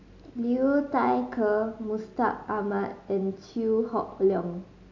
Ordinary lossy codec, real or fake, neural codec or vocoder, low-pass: AAC, 48 kbps; real; none; 7.2 kHz